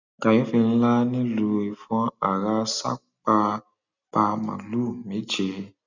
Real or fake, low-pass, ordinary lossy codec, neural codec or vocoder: real; 7.2 kHz; none; none